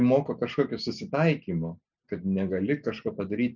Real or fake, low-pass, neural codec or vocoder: real; 7.2 kHz; none